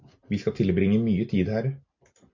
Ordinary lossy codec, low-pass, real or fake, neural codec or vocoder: MP3, 48 kbps; 7.2 kHz; real; none